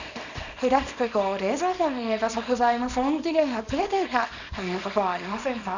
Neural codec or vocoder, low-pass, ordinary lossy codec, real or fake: codec, 24 kHz, 0.9 kbps, WavTokenizer, small release; 7.2 kHz; none; fake